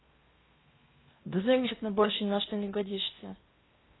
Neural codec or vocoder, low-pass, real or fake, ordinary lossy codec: codec, 16 kHz in and 24 kHz out, 0.8 kbps, FocalCodec, streaming, 65536 codes; 7.2 kHz; fake; AAC, 16 kbps